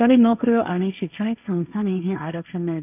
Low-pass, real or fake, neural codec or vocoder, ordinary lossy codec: 3.6 kHz; fake; codec, 16 kHz, 1.1 kbps, Voila-Tokenizer; none